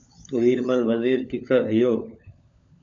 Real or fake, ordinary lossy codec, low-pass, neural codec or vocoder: fake; AAC, 64 kbps; 7.2 kHz; codec, 16 kHz, 16 kbps, FunCodec, trained on LibriTTS, 50 frames a second